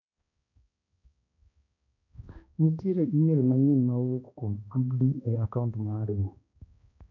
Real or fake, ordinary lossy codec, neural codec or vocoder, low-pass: fake; none; codec, 16 kHz, 1 kbps, X-Codec, HuBERT features, trained on balanced general audio; 7.2 kHz